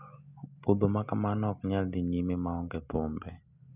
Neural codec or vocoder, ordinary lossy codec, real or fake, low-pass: none; none; real; 3.6 kHz